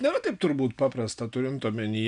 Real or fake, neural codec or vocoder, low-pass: real; none; 9.9 kHz